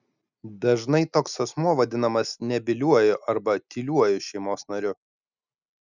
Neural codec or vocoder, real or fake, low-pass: none; real; 7.2 kHz